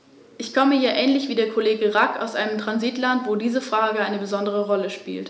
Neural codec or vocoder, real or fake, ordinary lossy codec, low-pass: none; real; none; none